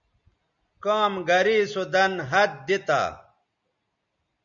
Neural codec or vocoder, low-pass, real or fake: none; 7.2 kHz; real